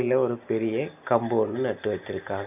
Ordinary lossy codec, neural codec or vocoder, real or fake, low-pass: none; none; real; 3.6 kHz